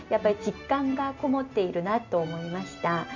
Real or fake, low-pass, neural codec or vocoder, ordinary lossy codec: real; 7.2 kHz; none; none